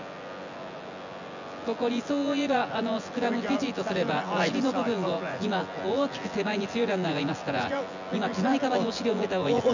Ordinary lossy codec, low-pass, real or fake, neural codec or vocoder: none; 7.2 kHz; fake; vocoder, 24 kHz, 100 mel bands, Vocos